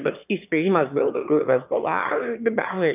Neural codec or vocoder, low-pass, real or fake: autoencoder, 22.05 kHz, a latent of 192 numbers a frame, VITS, trained on one speaker; 3.6 kHz; fake